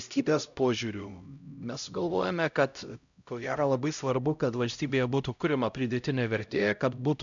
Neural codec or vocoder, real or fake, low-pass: codec, 16 kHz, 0.5 kbps, X-Codec, HuBERT features, trained on LibriSpeech; fake; 7.2 kHz